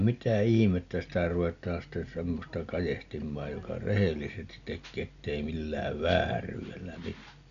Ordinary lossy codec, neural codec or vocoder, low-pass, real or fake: none; none; 7.2 kHz; real